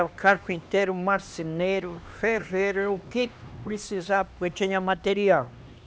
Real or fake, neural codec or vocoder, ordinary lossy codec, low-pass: fake; codec, 16 kHz, 2 kbps, X-Codec, HuBERT features, trained on LibriSpeech; none; none